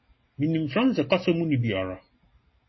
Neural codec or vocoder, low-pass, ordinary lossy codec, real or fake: none; 7.2 kHz; MP3, 24 kbps; real